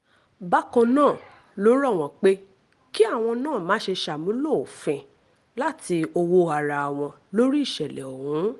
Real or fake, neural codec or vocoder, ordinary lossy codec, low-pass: real; none; Opus, 24 kbps; 10.8 kHz